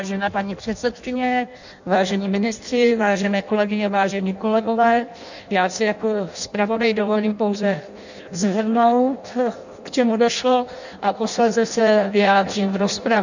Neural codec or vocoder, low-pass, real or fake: codec, 16 kHz in and 24 kHz out, 0.6 kbps, FireRedTTS-2 codec; 7.2 kHz; fake